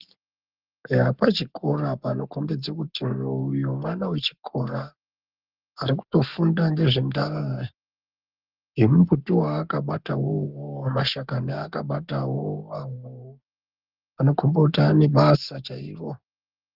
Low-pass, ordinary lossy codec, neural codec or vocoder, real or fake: 5.4 kHz; Opus, 32 kbps; codec, 44.1 kHz, 7.8 kbps, Pupu-Codec; fake